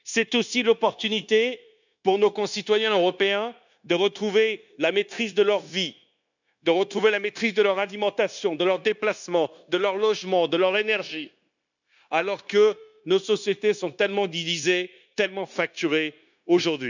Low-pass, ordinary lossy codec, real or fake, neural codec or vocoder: 7.2 kHz; none; fake; codec, 16 kHz, 0.9 kbps, LongCat-Audio-Codec